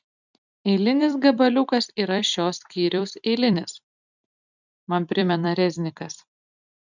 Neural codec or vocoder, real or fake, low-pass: vocoder, 44.1 kHz, 128 mel bands every 256 samples, BigVGAN v2; fake; 7.2 kHz